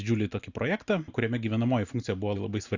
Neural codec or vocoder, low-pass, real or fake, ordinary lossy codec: none; 7.2 kHz; real; Opus, 64 kbps